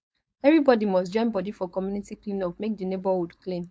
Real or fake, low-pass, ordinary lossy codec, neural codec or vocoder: fake; none; none; codec, 16 kHz, 4.8 kbps, FACodec